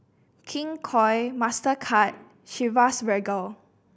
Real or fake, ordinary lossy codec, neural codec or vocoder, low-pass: real; none; none; none